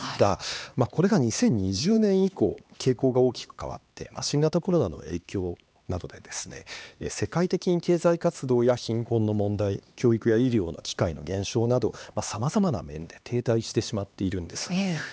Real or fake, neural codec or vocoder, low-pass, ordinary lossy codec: fake; codec, 16 kHz, 2 kbps, X-Codec, HuBERT features, trained on LibriSpeech; none; none